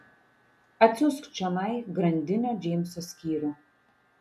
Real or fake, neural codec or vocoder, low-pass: real; none; 14.4 kHz